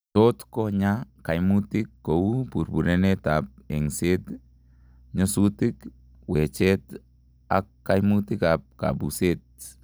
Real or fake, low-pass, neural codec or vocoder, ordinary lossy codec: real; none; none; none